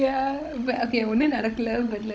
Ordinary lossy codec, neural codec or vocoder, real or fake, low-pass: none; codec, 16 kHz, 16 kbps, FunCodec, trained on Chinese and English, 50 frames a second; fake; none